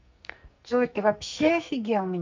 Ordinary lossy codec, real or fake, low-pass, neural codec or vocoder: MP3, 64 kbps; fake; 7.2 kHz; codec, 44.1 kHz, 2.6 kbps, SNAC